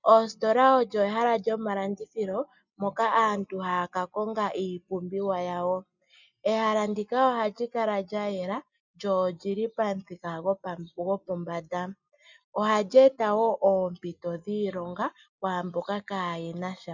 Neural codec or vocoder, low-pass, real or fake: none; 7.2 kHz; real